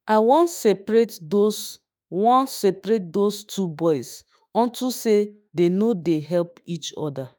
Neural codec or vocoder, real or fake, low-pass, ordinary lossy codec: autoencoder, 48 kHz, 32 numbers a frame, DAC-VAE, trained on Japanese speech; fake; none; none